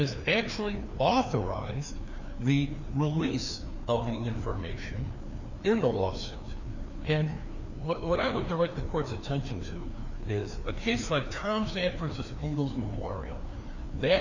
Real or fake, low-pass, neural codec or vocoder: fake; 7.2 kHz; codec, 16 kHz, 2 kbps, FreqCodec, larger model